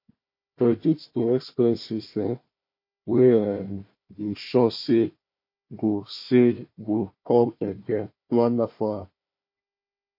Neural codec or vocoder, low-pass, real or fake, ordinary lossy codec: codec, 16 kHz, 1 kbps, FunCodec, trained on Chinese and English, 50 frames a second; 5.4 kHz; fake; MP3, 32 kbps